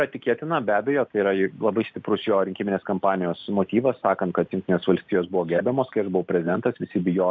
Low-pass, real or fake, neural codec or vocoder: 7.2 kHz; real; none